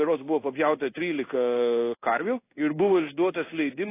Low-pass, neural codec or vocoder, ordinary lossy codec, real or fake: 3.6 kHz; codec, 16 kHz in and 24 kHz out, 1 kbps, XY-Tokenizer; AAC, 24 kbps; fake